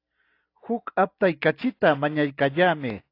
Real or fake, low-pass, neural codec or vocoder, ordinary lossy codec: real; 5.4 kHz; none; AAC, 32 kbps